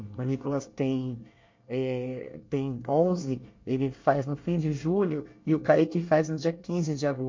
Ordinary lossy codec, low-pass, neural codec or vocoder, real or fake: MP3, 48 kbps; 7.2 kHz; codec, 24 kHz, 1 kbps, SNAC; fake